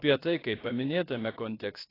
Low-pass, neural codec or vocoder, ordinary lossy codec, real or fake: 5.4 kHz; codec, 16 kHz, about 1 kbps, DyCAST, with the encoder's durations; AAC, 24 kbps; fake